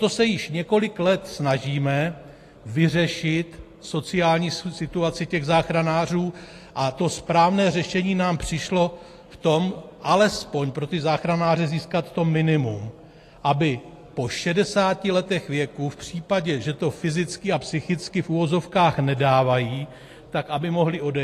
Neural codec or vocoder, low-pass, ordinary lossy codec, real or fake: none; 14.4 kHz; AAC, 48 kbps; real